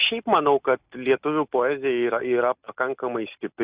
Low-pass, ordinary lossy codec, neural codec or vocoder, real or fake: 3.6 kHz; Opus, 64 kbps; none; real